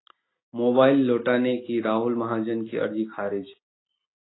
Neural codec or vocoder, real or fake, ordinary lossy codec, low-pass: none; real; AAC, 16 kbps; 7.2 kHz